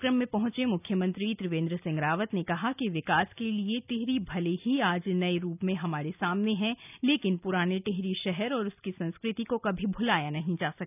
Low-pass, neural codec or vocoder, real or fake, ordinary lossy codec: 3.6 kHz; none; real; none